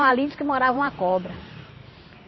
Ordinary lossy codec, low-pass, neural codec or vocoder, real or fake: MP3, 24 kbps; 7.2 kHz; vocoder, 44.1 kHz, 128 mel bands every 512 samples, BigVGAN v2; fake